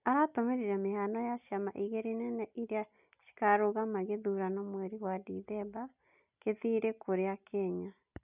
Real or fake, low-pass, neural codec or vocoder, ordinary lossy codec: real; 3.6 kHz; none; none